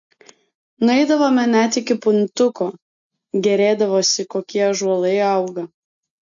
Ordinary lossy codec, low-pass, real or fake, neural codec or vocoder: MP3, 48 kbps; 7.2 kHz; real; none